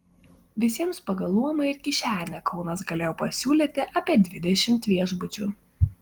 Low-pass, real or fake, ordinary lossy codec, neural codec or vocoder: 19.8 kHz; real; Opus, 24 kbps; none